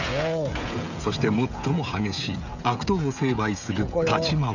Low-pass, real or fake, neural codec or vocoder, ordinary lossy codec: 7.2 kHz; fake; codec, 16 kHz, 16 kbps, FreqCodec, smaller model; none